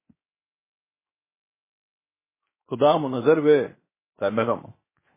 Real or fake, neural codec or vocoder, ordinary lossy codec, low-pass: fake; codec, 16 kHz, 0.7 kbps, FocalCodec; MP3, 16 kbps; 3.6 kHz